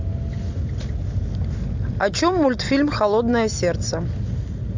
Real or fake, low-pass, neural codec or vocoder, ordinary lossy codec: real; 7.2 kHz; none; MP3, 64 kbps